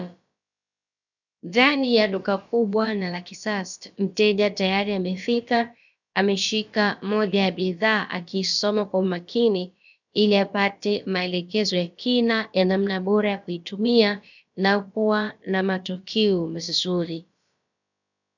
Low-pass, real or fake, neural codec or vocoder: 7.2 kHz; fake; codec, 16 kHz, about 1 kbps, DyCAST, with the encoder's durations